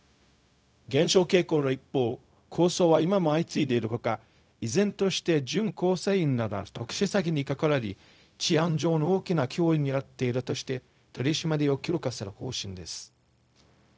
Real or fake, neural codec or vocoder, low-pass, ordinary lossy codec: fake; codec, 16 kHz, 0.4 kbps, LongCat-Audio-Codec; none; none